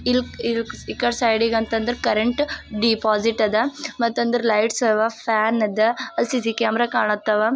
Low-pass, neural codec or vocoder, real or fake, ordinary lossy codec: none; none; real; none